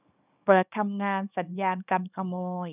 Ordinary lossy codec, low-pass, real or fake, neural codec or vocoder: none; 3.6 kHz; fake; codec, 24 kHz, 0.9 kbps, WavTokenizer, small release